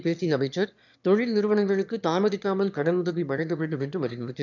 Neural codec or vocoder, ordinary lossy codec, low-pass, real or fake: autoencoder, 22.05 kHz, a latent of 192 numbers a frame, VITS, trained on one speaker; none; 7.2 kHz; fake